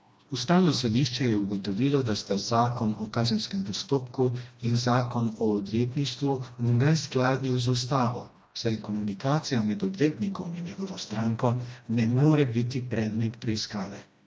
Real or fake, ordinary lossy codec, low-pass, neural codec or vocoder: fake; none; none; codec, 16 kHz, 1 kbps, FreqCodec, smaller model